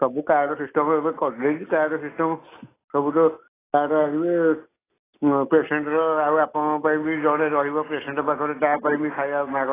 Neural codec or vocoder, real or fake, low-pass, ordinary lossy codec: none; real; 3.6 kHz; AAC, 16 kbps